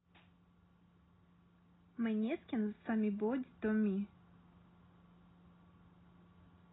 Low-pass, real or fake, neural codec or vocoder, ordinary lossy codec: 7.2 kHz; real; none; AAC, 16 kbps